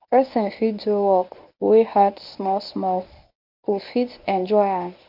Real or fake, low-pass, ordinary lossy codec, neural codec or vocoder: fake; 5.4 kHz; AAC, 48 kbps; codec, 24 kHz, 0.9 kbps, WavTokenizer, medium speech release version 1